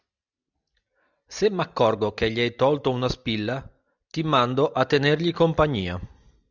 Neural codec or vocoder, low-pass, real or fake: none; 7.2 kHz; real